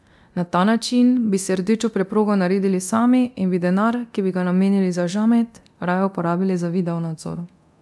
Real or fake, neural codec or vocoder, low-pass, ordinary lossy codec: fake; codec, 24 kHz, 0.9 kbps, DualCodec; none; none